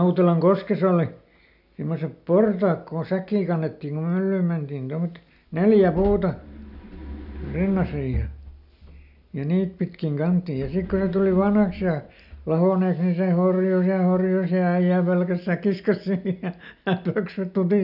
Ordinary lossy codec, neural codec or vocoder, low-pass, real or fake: none; none; 5.4 kHz; real